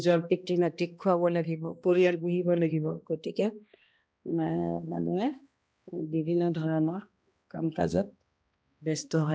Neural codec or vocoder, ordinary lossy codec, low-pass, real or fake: codec, 16 kHz, 1 kbps, X-Codec, HuBERT features, trained on balanced general audio; none; none; fake